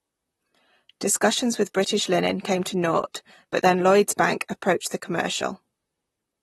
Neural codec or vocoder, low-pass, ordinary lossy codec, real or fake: vocoder, 44.1 kHz, 128 mel bands, Pupu-Vocoder; 19.8 kHz; AAC, 32 kbps; fake